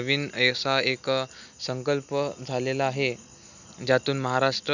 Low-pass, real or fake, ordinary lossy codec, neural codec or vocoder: 7.2 kHz; real; none; none